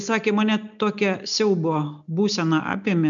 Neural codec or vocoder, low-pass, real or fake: none; 7.2 kHz; real